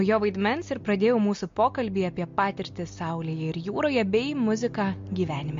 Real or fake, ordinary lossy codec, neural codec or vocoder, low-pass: real; MP3, 48 kbps; none; 7.2 kHz